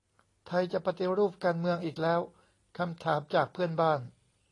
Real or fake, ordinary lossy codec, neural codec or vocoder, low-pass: real; AAC, 32 kbps; none; 10.8 kHz